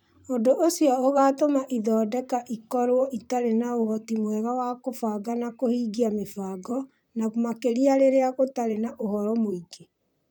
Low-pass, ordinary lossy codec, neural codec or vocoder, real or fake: none; none; vocoder, 44.1 kHz, 128 mel bands, Pupu-Vocoder; fake